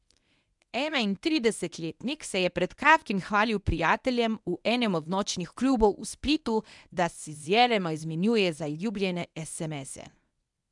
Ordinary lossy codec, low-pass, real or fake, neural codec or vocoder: none; 10.8 kHz; fake; codec, 24 kHz, 0.9 kbps, WavTokenizer, medium speech release version 1